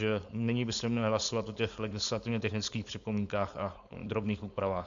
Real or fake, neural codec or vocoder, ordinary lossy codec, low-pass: fake; codec, 16 kHz, 4.8 kbps, FACodec; MP3, 64 kbps; 7.2 kHz